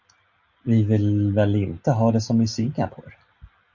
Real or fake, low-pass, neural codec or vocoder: real; 7.2 kHz; none